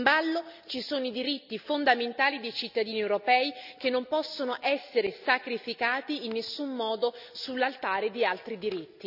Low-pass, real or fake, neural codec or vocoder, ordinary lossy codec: 5.4 kHz; real; none; none